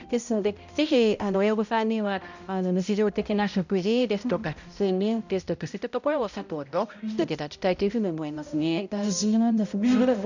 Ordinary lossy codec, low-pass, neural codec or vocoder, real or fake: none; 7.2 kHz; codec, 16 kHz, 0.5 kbps, X-Codec, HuBERT features, trained on balanced general audio; fake